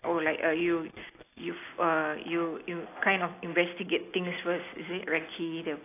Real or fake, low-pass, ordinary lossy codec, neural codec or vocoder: real; 3.6 kHz; AAC, 24 kbps; none